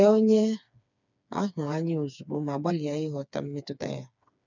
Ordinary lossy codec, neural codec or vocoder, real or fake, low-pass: none; codec, 16 kHz, 4 kbps, FreqCodec, smaller model; fake; 7.2 kHz